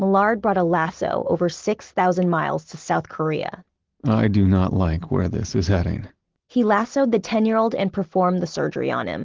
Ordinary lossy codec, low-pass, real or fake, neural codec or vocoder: Opus, 16 kbps; 7.2 kHz; real; none